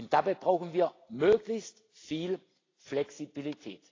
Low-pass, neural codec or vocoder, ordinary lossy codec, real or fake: 7.2 kHz; none; AAC, 32 kbps; real